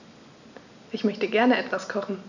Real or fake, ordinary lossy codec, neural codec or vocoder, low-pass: real; none; none; 7.2 kHz